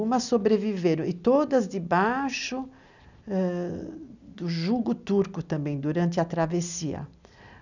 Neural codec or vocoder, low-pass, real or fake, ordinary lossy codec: none; 7.2 kHz; real; none